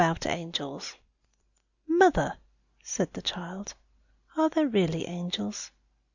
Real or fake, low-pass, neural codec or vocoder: real; 7.2 kHz; none